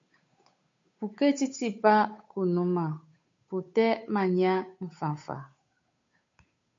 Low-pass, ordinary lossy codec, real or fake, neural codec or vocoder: 7.2 kHz; MP3, 48 kbps; fake; codec, 16 kHz, 8 kbps, FunCodec, trained on Chinese and English, 25 frames a second